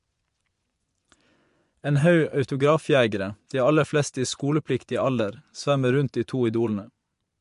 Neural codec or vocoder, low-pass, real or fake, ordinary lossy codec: vocoder, 24 kHz, 100 mel bands, Vocos; 10.8 kHz; fake; MP3, 64 kbps